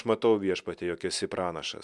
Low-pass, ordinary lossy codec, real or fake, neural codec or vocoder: 10.8 kHz; MP3, 96 kbps; real; none